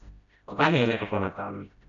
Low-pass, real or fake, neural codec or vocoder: 7.2 kHz; fake; codec, 16 kHz, 0.5 kbps, FreqCodec, smaller model